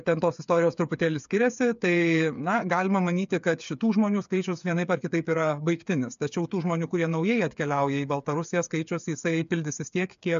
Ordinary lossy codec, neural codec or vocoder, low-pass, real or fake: MP3, 64 kbps; codec, 16 kHz, 8 kbps, FreqCodec, smaller model; 7.2 kHz; fake